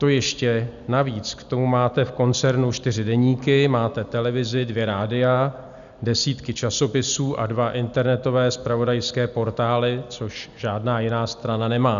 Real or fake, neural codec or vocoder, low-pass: real; none; 7.2 kHz